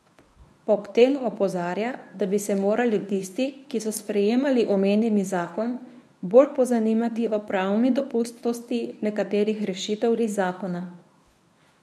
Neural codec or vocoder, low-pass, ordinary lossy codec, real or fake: codec, 24 kHz, 0.9 kbps, WavTokenizer, medium speech release version 1; none; none; fake